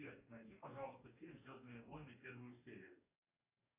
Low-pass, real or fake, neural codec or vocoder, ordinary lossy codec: 3.6 kHz; fake; codec, 44.1 kHz, 2.6 kbps, DAC; AAC, 32 kbps